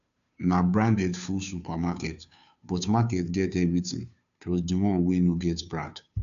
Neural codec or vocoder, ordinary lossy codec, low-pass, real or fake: codec, 16 kHz, 2 kbps, FunCodec, trained on Chinese and English, 25 frames a second; none; 7.2 kHz; fake